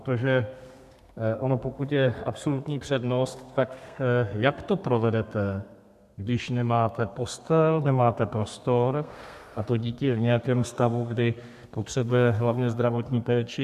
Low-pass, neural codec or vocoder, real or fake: 14.4 kHz; codec, 32 kHz, 1.9 kbps, SNAC; fake